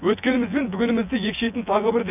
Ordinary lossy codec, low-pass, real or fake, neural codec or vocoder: none; 3.6 kHz; fake; vocoder, 24 kHz, 100 mel bands, Vocos